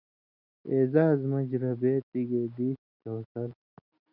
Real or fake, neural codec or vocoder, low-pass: real; none; 5.4 kHz